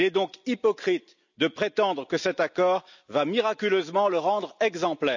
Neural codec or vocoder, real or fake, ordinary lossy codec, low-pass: none; real; none; 7.2 kHz